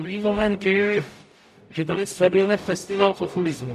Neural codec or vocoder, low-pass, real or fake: codec, 44.1 kHz, 0.9 kbps, DAC; 14.4 kHz; fake